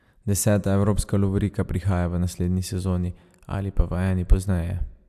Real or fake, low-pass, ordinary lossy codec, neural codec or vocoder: real; 14.4 kHz; AAC, 96 kbps; none